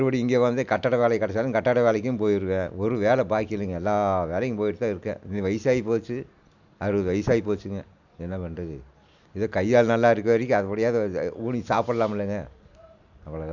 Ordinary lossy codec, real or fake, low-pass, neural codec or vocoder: none; real; 7.2 kHz; none